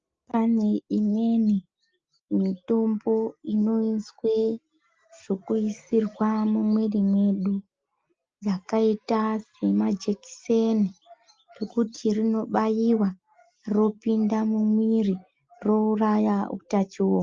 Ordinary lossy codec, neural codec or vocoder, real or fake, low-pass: Opus, 24 kbps; none; real; 7.2 kHz